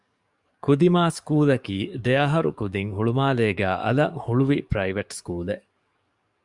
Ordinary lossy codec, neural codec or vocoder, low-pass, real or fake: Opus, 64 kbps; codec, 44.1 kHz, 7.8 kbps, DAC; 10.8 kHz; fake